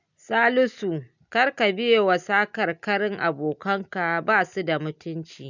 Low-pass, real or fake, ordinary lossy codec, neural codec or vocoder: 7.2 kHz; real; none; none